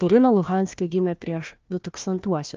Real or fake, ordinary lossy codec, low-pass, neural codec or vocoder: fake; Opus, 24 kbps; 7.2 kHz; codec, 16 kHz, 1 kbps, FunCodec, trained on Chinese and English, 50 frames a second